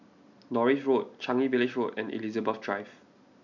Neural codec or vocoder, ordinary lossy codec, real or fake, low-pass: none; none; real; 7.2 kHz